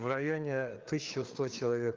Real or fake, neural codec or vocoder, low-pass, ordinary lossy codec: fake; codec, 16 kHz, 4 kbps, FreqCodec, larger model; 7.2 kHz; Opus, 32 kbps